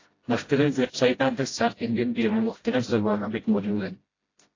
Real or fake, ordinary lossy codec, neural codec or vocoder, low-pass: fake; AAC, 32 kbps; codec, 16 kHz, 0.5 kbps, FreqCodec, smaller model; 7.2 kHz